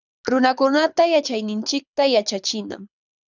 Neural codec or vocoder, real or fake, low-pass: codec, 24 kHz, 6 kbps, HILCodec; fake; 7.2 kHz